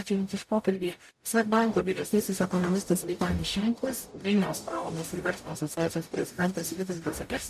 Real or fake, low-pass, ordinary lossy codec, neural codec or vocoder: fake; 14.4 kHz; MP3, 64 kbps; codec, 44.1 kHz, 0.9 kbps, DAC